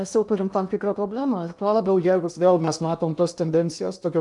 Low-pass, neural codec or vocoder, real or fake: 10.8 kHz; codec, 16 kHz in and 24 kHz out, 0.8 kbps, FocalCodec, streaming, 65536 codes; fake